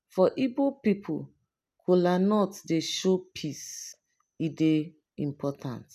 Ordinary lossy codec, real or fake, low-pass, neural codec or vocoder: none; real; 14.4 kHz; none